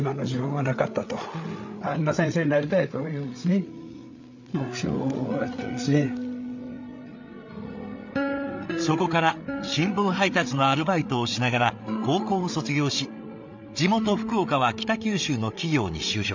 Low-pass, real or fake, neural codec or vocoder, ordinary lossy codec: 7.2 kHz; fake; codec, 16 kHz, 8 kbps, FreqCodec, larger model; MP3, 64 kbps